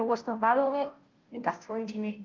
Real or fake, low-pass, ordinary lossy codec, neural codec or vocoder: fake; 7.2 kHz; Opus, 32 kbps; codec, 16 kHz, 0.5 kbps, FunCodec, trained on Chinese and English, 25 frames a second